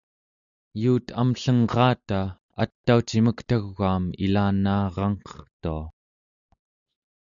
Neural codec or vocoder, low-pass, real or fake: none; 7.2 kHz; real